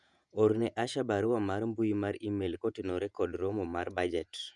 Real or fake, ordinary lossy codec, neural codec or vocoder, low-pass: fake; none; vocoder, 48 kHz, 128 mel bands, Vocos; 10.8 kHz